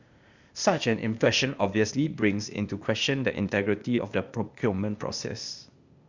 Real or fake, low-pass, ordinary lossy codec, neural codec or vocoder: fake; 7.2 kHz; Opus, 64 kbps; codec, 16 kHz, 0.8 kbps, ZipCodec